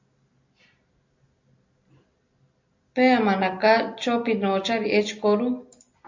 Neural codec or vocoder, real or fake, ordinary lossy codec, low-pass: none; real; MP3, 48 kbps; 7.2 kHz